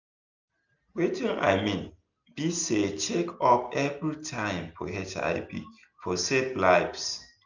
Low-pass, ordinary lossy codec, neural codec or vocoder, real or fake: 7.2 kHz; none; none; real